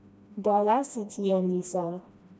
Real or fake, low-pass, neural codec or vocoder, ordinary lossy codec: fake; none; codec, 16 kHz, 1 kbps, FreqCodec, smaller model; none